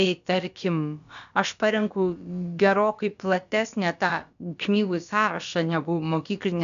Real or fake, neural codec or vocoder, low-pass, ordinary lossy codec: fake; codec, 16 kHz, about 1 kbps, DyCAST, with the encoder's durations; 7.2 kHz; MP3, 96 kbps